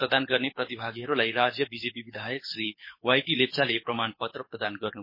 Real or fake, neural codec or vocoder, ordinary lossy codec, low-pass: fake; codec, 24 kHz, 6 kbps, HILCodec; MP3, 24 kbps; 5.4 kHz